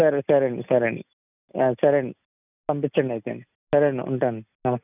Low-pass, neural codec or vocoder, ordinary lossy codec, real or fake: 3.6 kHz; none; none; real